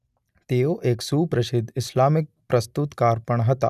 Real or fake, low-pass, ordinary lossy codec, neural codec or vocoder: real; 14.4 kHz; none; none